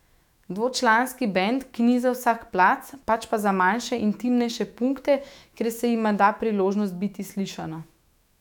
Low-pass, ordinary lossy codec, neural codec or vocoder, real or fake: 19.8 kHz; none; autoencoder, 48 kHz, 128 numbers a frame, DAC-VAE, trained on Japanese speech; fake